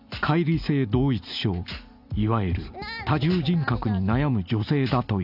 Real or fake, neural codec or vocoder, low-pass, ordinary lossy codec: real; none; 5.4 kHz; none